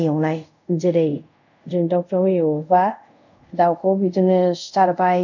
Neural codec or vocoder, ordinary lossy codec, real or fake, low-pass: codec, 24 kHz, 0.5 kbps, DualCodec; none; fake; 7.2 kHz